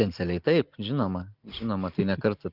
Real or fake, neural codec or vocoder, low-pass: real; none; 5.4 kHz